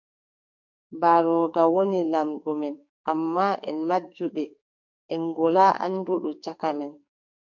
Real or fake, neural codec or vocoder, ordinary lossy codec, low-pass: fake; codec, 44.1 kHz, 2.6 kbps, SNAC; MP3, 48 kbps; 7.2 kHz